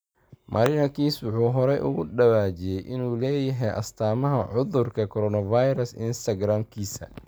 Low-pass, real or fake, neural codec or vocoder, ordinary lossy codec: none; real; none; none